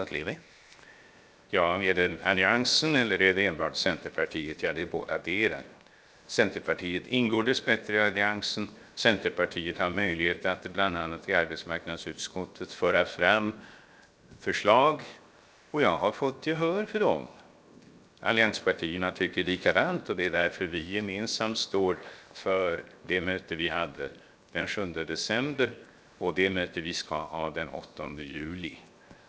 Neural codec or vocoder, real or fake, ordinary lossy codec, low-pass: codec, 16 kHz, 0.7 kbps, FocalCodec; fake; none; none